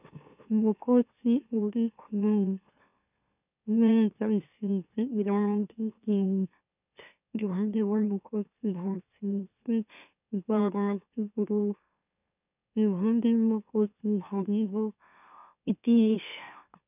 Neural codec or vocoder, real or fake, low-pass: autoencoder, 44.1 kHz, a latent of 192 numbers a frame, MeloTTS; fake; 3.6 kHz